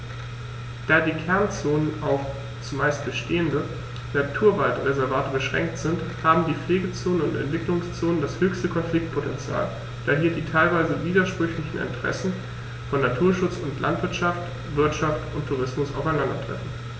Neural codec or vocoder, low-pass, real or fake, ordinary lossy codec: none; none; real; none